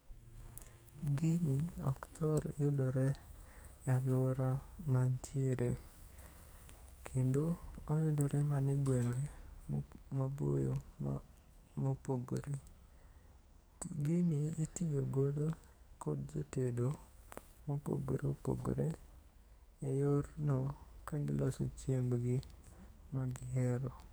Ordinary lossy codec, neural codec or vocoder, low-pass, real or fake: none; codec, 44.1 kHz, 2.6 kbps, SNAC; none; fake